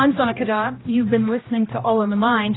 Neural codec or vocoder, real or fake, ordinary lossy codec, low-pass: codec, 16 kHz, 1 kbps, X-Codec, HuBERT features, trained on general audio; fake; AAC, 16 kbps; 7.2 kHz